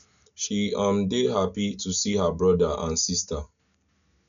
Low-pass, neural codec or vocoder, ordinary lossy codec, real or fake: 7.2 kHz; none; none; real